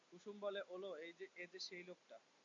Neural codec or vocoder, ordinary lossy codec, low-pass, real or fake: none; AAC, 64 kbps; 7.2 kHz; real